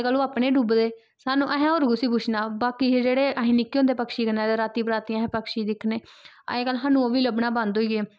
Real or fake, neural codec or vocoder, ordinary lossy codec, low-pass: real; none; none; none